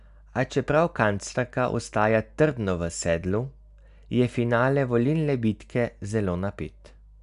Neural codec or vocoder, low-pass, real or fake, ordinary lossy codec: none; 10.8 kHz; real; none